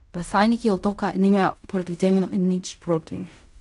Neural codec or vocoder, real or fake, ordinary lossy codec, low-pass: codec, 16 kHz in and 24 kHz out, 0.4 kbps, LongCat-Audio-Codec, fine tuned four codebook decoder; fake; none; 10.8 kHz